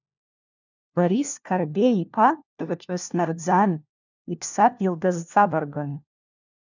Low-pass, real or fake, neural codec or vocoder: 7.2 kHz; fake; codec, 16 kHz, 1 kbps, FunCodec, trained on LibriTTS, 50 frames a second